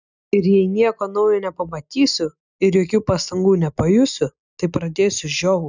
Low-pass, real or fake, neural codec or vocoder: 7.2 kHz; real; none